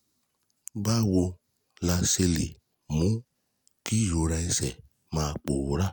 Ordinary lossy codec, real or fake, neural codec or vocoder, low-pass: none; real; none; none